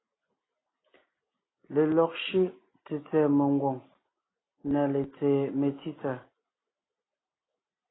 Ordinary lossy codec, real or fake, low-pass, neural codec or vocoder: AAC, 16 kbps; real; 7.2 kHz; none